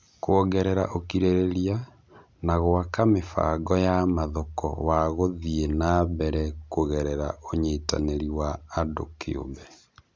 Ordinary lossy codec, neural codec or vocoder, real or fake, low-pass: none; none; real; none